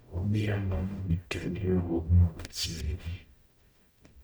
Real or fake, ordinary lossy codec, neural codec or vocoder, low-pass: fake; none; codec, 44.1 kHz, 0.9 kbps, DAC; none